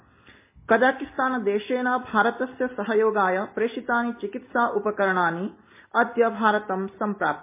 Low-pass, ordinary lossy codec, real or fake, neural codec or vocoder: 3.6 kHz; MP3, 24 kbps; real; none